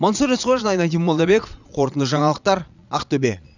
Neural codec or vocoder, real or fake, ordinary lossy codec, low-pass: vocoder, 22.05 kHz, 80 mel bands, Vocos; fake; none; 7.2 kHz